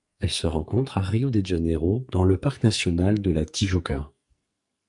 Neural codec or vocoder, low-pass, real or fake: codec, 44.1 kHz, 2.6 kbps, SNAC; 10.8 kHz; fake